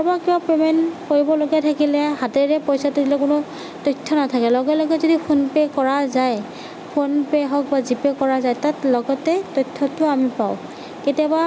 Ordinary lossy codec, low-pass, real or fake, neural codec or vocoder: none; none; real; none